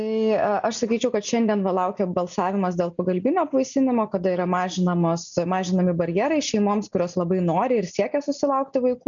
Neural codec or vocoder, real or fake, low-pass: none; real; 7.2 kHz